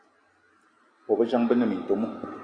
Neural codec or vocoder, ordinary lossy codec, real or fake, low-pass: none; Opus, 64 kbps; real; 9.9 kHz